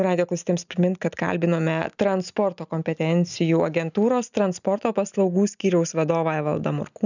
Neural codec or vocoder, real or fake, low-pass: none; real; 7.2 kHz